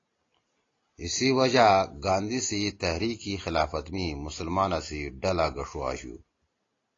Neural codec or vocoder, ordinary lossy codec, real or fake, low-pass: none; AAC, 32 kbps; real; 7.2 kHz